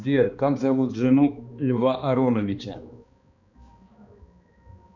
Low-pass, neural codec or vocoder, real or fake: 7.2 kHz; codec, 16 kHz, 2 kbps, X-Codec, HuBERT features, trained on balanced general audio; fake